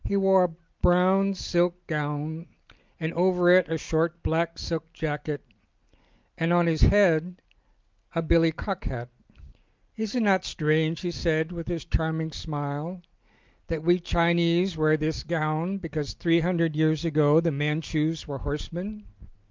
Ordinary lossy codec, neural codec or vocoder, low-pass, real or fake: Opus, 24 kbps; none; 7.2 kHz; real